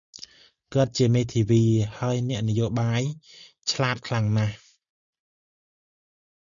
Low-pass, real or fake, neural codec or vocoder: 7.2 kHz; real; none